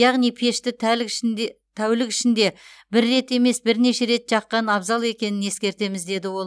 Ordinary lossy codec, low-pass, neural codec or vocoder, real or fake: none; none; none; real